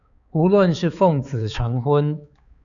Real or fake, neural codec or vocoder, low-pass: fake; codec, 16 kHz, 4 kbps, X-Codec, HuBERT features, trained on balanced general audio; 7.2 kHz